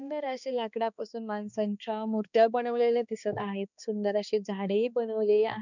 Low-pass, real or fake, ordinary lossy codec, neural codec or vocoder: 7.2 kHz; fake; none; codec, 16 kHz, 2 kbps, X-Codec, HuBERT features, trained on balanced general audio